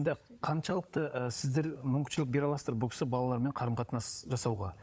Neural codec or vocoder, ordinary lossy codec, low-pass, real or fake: codec, 16 kHz, 16 kbps, FunCodec, trained on LibriTTS, 50 frames a second; none; none; fake